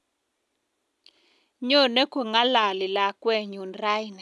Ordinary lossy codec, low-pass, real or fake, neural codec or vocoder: none; none; real; none